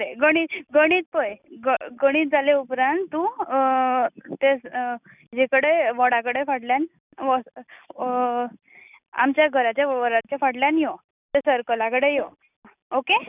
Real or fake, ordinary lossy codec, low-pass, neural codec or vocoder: real; none; 3.6 kHz; none